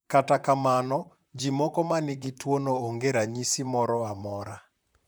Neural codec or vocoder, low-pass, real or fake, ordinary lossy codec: vocoder, 44.1 kHz, 128 mel bands, Pupu-Vocoder; none; fake; none